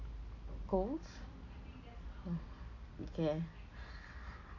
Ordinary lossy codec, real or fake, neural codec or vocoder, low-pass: AAC, 48 kbps; real; none; 7.2 kHz